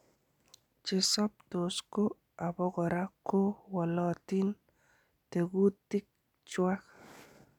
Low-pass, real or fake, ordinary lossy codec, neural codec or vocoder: 19.8 kHz; real; none; none